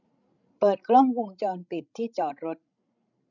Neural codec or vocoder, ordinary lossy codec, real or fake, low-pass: codec, 16 kHz, 16 kbps, FreqCodec, larger model; none; fake; 7.2 kHz